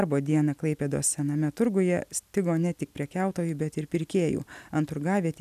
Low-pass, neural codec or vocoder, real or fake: 14.4 kHz; none; real